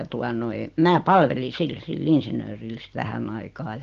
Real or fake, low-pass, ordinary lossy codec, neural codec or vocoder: real; 7.2 kHz; Opus, 16 kbps; none